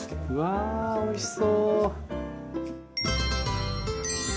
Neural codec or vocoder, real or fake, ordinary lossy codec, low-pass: none; real; none; none